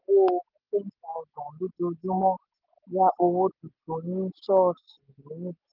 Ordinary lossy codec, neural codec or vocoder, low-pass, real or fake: Opus, 24 kbps; none; 5.4 kHz; real